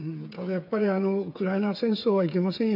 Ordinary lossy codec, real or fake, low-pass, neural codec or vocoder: none; fake; 5.4 kHz; codec, 16 kHz, 8 kbps, FreqCodec, smaller model